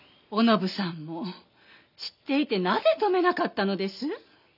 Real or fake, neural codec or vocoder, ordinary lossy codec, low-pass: real; none; none; 5.4 kHz